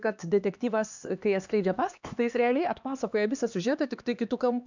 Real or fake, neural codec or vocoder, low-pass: fake; codec, 16 kHz, 2 kbps, X-Codec, HuBERT features, trained on LibriSpeech; 7.2 kHz